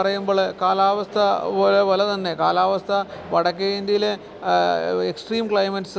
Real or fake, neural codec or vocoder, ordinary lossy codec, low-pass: real; none; none; none